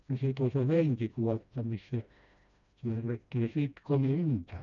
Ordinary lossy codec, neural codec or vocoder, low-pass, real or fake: none; codec, 16 kHz, 1 kbps, FreqCodec, smaller model; 7.2 kHz; fake